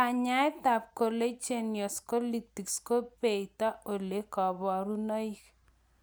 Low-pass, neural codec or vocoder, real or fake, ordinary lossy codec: none; none; real; none